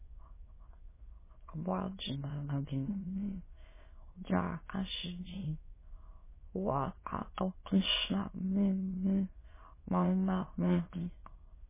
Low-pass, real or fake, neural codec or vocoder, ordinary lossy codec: 3.6 kHz; fake; autoencoder, 22.05 kHz, a latent of 192 numbers a frame, VITS, trained on many speakers; MP3, 16 kbps